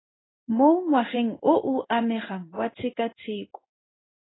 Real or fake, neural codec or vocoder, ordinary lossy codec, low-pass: fake; codec, 16 kHz in and 24 kHz out, 1 kbps, XY-Tokenizer; AAC, 16 kbps; 7.2 kHz